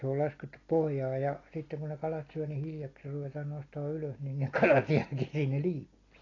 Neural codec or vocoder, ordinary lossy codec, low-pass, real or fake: none; AAC, 32 kbps; 7.2 kHz; real